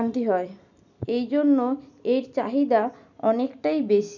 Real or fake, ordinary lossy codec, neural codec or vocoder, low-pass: real; none; none; 7.2 kHz